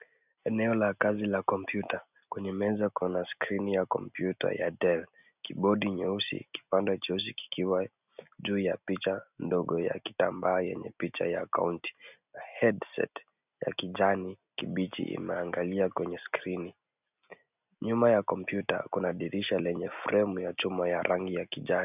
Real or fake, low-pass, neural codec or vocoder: real; 3.6 kHz; none